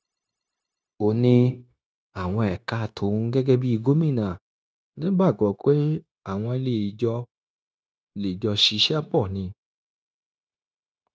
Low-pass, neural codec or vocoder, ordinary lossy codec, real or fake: none; codec, 16 kHz, 0.9 kbps, LongCat-Audio-Codec; none; fake